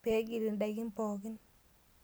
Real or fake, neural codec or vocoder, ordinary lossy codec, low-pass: real; none; none; none